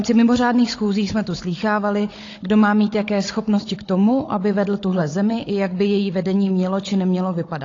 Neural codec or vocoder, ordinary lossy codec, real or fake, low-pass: codec, 16 kHz, 16 kbps, FunCodec, trained on Chinese and English, 50 frames a second; AAC, 32 kbps; fake; 7.2 kHz